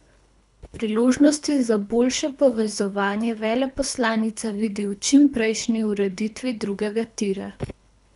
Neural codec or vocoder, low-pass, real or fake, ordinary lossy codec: codec, 24 kHz, 3 kbps, HILCodec; 10.8 kHz; fake; none